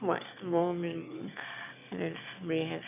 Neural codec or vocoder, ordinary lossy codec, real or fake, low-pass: autoencoder, 22.05 kHz, a latent of 192 numbers a frame, VITS, trained on one speaker; AAC, 32 kbps; fake; 3.6 kHz